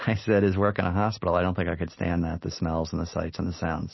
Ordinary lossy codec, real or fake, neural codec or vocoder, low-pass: MP3, 24 kbps; real; none; 7.2 kHz